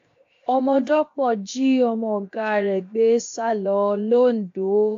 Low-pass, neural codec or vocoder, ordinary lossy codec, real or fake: 7.2 kHz; codec, 16 kHz, 0.7 kbps, FocalCodec; AAC, 48 kbps; fake